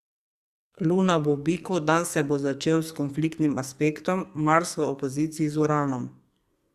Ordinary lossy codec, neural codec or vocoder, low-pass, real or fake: Opus, 64 kbps; codec, 44.1 kHz, 2.6 kbps, SNAC; 14.4 kHz; fake